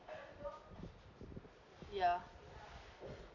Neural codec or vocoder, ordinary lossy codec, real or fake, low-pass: none; none; real; 7.2 kHz